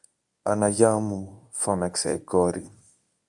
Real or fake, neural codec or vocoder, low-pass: fake; codec, 24 kHz, 0.9 kbps, WavTokenizer, medium speech release version 1; 10.8 kHz